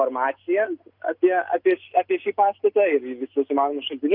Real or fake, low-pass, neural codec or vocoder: real; 5.4 kHz; none